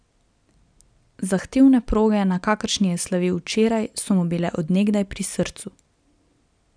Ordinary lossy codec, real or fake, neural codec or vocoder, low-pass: none; real; none; 9.9 kHz